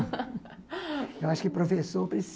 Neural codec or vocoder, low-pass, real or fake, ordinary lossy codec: none; none; real; none